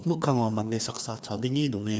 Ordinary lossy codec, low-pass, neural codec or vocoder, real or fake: none; none; codec, 16 kHz, 2 kbps, FreqCodec, larger model; fake